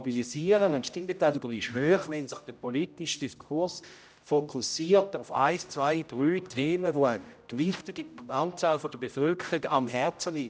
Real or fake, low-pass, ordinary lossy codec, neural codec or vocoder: fake; none; none; codec, 16 kHz, 0.5 kbps, X-Codec, HuBERT features, trained on general audio